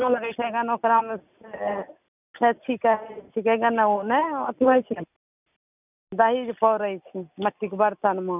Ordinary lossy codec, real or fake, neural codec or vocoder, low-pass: none; real; none; 3.6 kHz